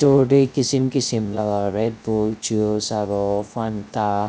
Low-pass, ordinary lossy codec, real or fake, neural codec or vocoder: none; none; fake; codec, 16 kHz, 0.3 kbps, FocalCodec